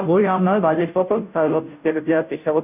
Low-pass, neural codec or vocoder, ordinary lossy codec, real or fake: 3.6 kHz; codec, 16 kHz, 0.5 kbps, FunCodec, trained on Chinese and English, 25 frames a second; none; fake